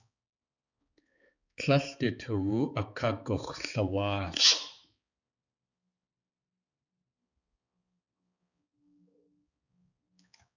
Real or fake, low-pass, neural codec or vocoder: fake; 7.2 kHz; codec, 16 kHz, 4 kbps, X-Codec, HuBERT features, trained on balanced general audio